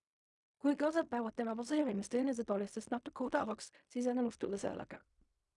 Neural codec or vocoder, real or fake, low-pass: codec, 16 kHz in and 24 kHz out, 0.4 kbps, LongCat-Audio-Codec, fine tuned four codebook decoder; fake; 10.8 kHz